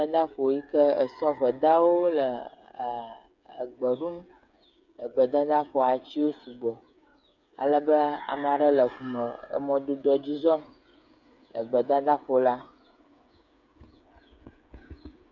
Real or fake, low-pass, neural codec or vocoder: fake; 7.2 kHz; codec, 16 kHz, 8 kbps, FreqCodec, smaller model